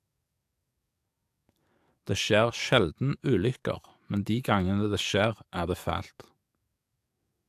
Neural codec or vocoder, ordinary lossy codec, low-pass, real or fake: codec, 44.1 kHz, 7.8 kbps, DAC; AAC, 96 kbps; 14.4 kHz; fake